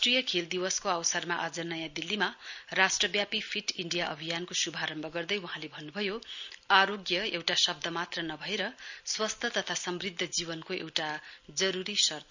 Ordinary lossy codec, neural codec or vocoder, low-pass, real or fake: none; none; 7.2 kHz; real